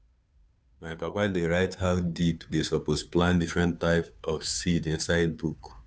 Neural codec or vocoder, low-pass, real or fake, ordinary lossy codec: codec, 16 kHz, 2 kbps, FunCodec, trained on Chinese and English, 25 frames a second; none; fake; none